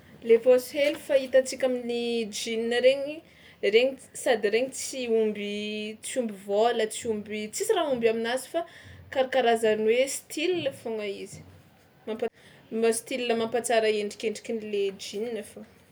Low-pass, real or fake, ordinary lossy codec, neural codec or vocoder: none; real; none; none